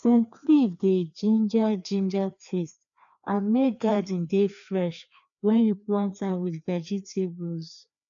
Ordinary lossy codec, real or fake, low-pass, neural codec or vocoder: none; fake; 7.2 kHz; codec, 16 kHz, 2 kbps, FreqCodec, larger model